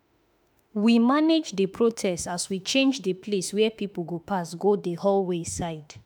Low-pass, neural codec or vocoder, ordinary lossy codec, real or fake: none; autoencoder, 48 kHz, 32 numbers a frame, DAC-VAE, trained on Japanese speech; none; fake